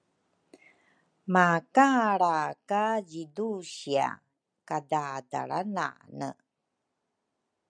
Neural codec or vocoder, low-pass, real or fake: none; 9.9 kHz; real